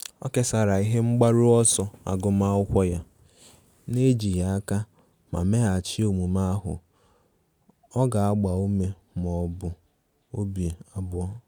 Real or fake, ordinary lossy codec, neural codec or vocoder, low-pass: real; none; none; none